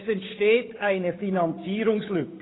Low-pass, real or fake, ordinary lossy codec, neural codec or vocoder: 7.2 kHz; fake; AAC, 16 kbps; codec, 16 kHz, 8 kbps, FunCodec, trained on Chinese and English, 25 frames a second